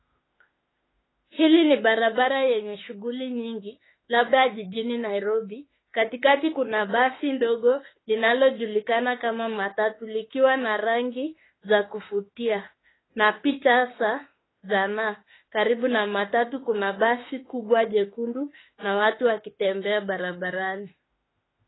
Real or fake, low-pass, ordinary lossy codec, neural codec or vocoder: fake; 7.2 kHz; AAC, 16 kbps; autoencoder, 48 kHz, 32 numbers a frame, DAC-VAE, trained on Japanese speech